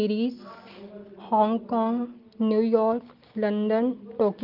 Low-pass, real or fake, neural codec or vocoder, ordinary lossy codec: 5.4 kHz; real; none; Opus, 24 kbps